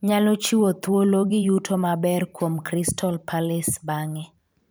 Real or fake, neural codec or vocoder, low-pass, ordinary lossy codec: fake; vocoder, 44.1 kHz, 128 mel bands every 512 samples, BigVGAN v2; none; none